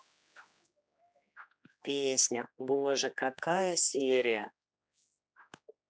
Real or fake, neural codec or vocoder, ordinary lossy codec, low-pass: fake; codec, 16 kHz, 2 kbps, X-Codec, HuBERT features, trained on general audio; none; none